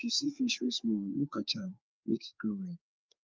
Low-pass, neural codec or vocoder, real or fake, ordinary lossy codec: 7.2 kHz; codec, 16 kHz, 4 kbps, FreqCodec, larger model; fake; Opus, 32 kbps